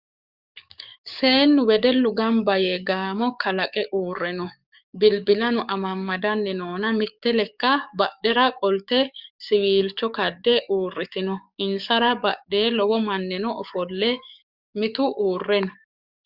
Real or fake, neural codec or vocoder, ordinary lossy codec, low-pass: fake; codec, 44.1 kHz, 7.8 kbps, DAC; Opus, 64 kbps; 5.4 kHz